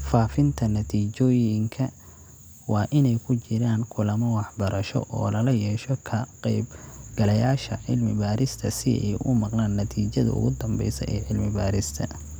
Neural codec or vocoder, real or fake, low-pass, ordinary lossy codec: none; real; none; none